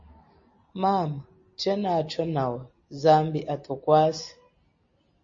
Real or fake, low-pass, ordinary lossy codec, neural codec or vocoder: real; 7.2 kHz; MP3, 32 kbps; none